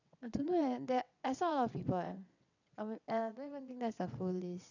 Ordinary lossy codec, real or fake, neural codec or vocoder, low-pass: none; fake; vocoder, 22.05 kHz, 80 mel bands, WaveNeXt; 7.2 kHz